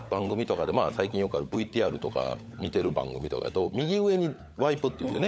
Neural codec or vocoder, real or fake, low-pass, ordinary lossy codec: codec, 16 kHz, 16 kbps, FunCodec, trained on LibriTTS, 50 frames a second; fake; none; none